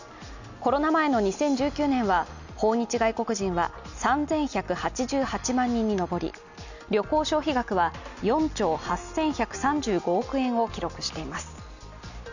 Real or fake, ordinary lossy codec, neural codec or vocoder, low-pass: real; none; none; 7.2 kHz